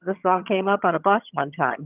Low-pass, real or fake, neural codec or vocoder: 3.6 kHz; fake; vocoder, 22.05 kHz, 80 mel bands, HiFi-GAN